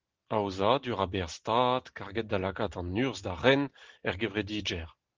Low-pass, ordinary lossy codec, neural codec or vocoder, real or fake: 7.2 kHz; Opus, 16 kbps; none; real